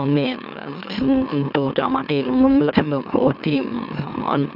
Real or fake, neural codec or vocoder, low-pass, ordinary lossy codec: fake; autoencoder, 44.1 kHz, a latent of 192 numbers a frame, MeloTTS; 5.4 kHz; none